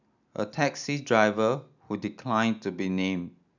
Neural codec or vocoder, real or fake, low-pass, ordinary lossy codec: none; real; 7.2 kHz; none